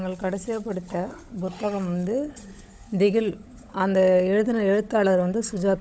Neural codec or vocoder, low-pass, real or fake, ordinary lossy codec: codec, 16 kHz, 16 kbps, FunCodec, trained on LibriTTS, 50 frames a second; none; fake; none